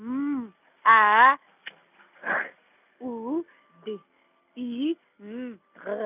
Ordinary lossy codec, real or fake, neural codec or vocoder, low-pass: none; real; none; 3.6 kHz